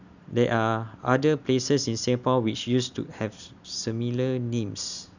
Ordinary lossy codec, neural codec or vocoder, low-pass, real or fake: none; none; 7.2 kHz; real